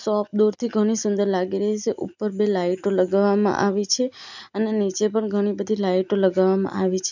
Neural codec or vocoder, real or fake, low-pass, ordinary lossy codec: none; real; 7.2 kHz; none